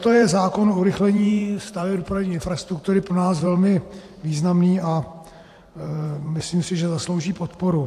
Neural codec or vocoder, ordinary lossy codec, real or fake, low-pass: vocoder, 44.1 kHz, 128 mel bands every 512 samples, BigVGAN v2; AAC, 64 kbps; fake; 14.4 kHz